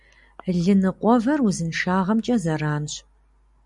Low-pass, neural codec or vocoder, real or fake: 10.8 kHz; none; real